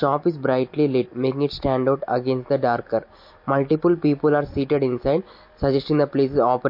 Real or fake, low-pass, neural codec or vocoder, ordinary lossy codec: real; 5.4 kHz; none; MP3, 32 kbps